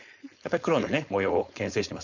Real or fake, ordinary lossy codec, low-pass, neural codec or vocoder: fake; none; 7.2 kHz; codec, 16 kHz, 4.8 kbps, FACodec